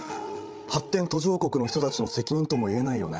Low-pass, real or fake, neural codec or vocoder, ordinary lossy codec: none; fake; codec, 16 kHz, 8 kbps, FreqCodec, larger model; none